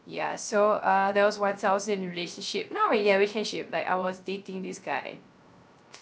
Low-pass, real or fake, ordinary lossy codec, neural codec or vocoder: none; fake; none; codec, 16 kHz, 0.3 kbps, FocalCodec